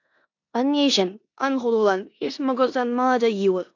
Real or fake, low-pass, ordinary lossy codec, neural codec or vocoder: fake; 7.2 kHz; AAC, 48 kbps; codec, 16 kHz in and 24 kHz out, 0.9 kbps, LongCat-Audio-Codec, four codebook decoder